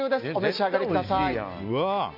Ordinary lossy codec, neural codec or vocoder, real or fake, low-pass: none; none; real; 5.4 kHz